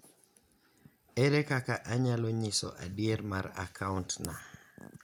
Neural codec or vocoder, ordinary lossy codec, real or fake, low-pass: none; none; real; 19.8 kHz